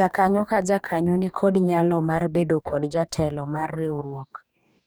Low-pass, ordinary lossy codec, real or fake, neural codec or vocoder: none; none; fake; codec, 44.1 kHz, 2.6 kbps, DAC